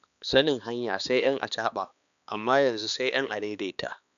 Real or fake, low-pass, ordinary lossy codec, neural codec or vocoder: fake; 7.2 kHz; none; codec, 16 kHz, 2 kbps, X-Codec, HuBERT features, trained on balanced general audio